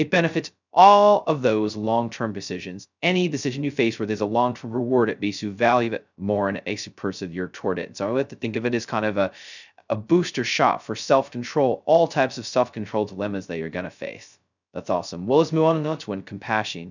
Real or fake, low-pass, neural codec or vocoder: fake; 7.2 kHz; codec, 16 kHz, 0.2 kbps, FocalCodec